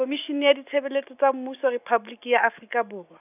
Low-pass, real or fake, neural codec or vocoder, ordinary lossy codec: 3.6 kHz; real; none; none